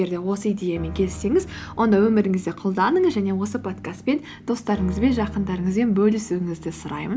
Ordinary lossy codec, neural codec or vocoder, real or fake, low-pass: none; none; real; none